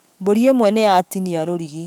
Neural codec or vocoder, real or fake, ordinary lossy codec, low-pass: codec, 44.1 kHz, 7.8 kbps, DAC; fake; none; 19.8 kHz